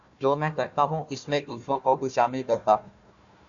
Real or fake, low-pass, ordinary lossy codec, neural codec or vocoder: fake; 7.2 kHz; AAC, 64 kbps; codec, 16 kHz, 1 kbps, FunCodec, trained on Chinese and English, 50 frames a second